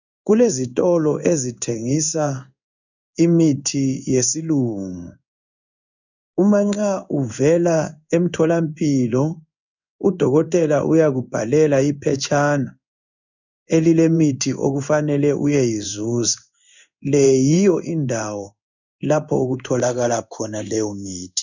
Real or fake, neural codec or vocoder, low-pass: fake; codec, 16 kHz in and 24 kHz out, 1 kbps, XY-Tokenizer; 7.2 kHz